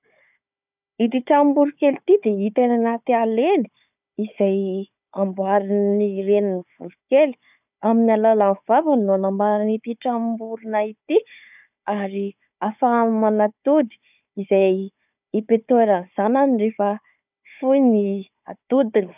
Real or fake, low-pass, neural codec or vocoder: fake; 3.6 kHz; codec, 16 kHz, 4 kbps, FunCodec, trained on Chinese and English, 50 frames a second